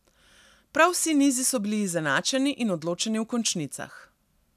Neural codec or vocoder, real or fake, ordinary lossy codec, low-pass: none; real; none; 14.4 kHz